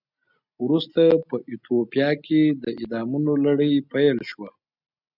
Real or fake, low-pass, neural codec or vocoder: real; 5.4 kHz; none